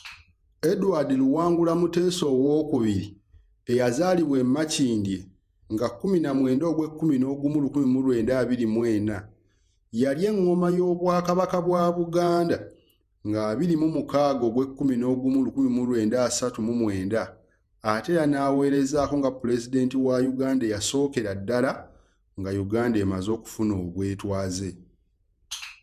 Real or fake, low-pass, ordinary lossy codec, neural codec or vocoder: fake; 14.4 kHz; none; vocoder, 48 kHz, 128 mel bands, Vocos